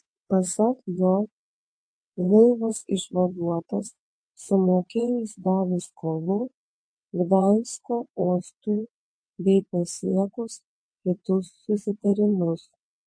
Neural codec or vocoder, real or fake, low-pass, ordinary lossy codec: vocoder, 22.05 kHz, 80 mel bands, WaveNeXt; fake; 9.9 kHz; MP3, 48 kbps